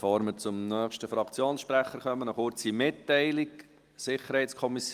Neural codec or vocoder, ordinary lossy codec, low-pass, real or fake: none; Opus, 32 kbps; 14.4 kHz; real